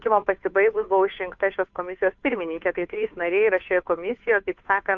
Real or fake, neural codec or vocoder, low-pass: fake; codec, 16 kHz, 2 kbps, FunCodec, trained on Chinese and English, 25 frames a second; 7.2 kHz